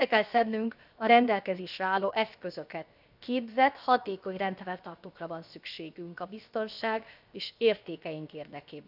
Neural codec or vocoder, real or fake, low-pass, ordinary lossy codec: codec, 16 kHz, 0.7 kbps, FocalCodec; fake; 5.4 kHz; none